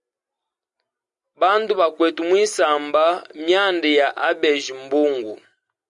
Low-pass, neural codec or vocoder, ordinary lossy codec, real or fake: 10.8 kHz; none; Opus, 64 kbps; real